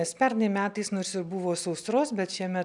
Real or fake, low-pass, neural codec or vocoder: real; 10.8 kHz; none